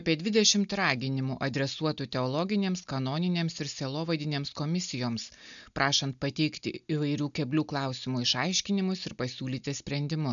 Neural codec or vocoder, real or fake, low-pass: none; real; 7.2 kHz